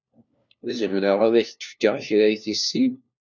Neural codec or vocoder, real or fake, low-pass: codec, 16 kHz, 1 kbps, FunCodec, trained on LibriTTS, 50 frames a second; fake; 7.2 kHz